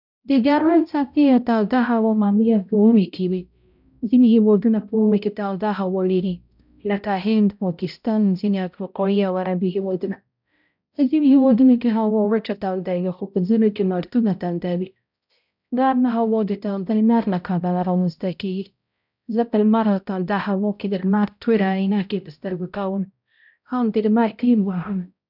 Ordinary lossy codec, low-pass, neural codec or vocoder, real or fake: none; 5.4 kHz; codec, 16 kHz, 0.5 kbps, X-Codec, HuBERT features, trained on balanced general audio; fake